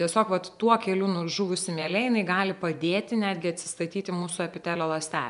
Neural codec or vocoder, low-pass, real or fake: vocoder, 24 kHz, 100 mel bands, Vocos; 10.8 kHz; fake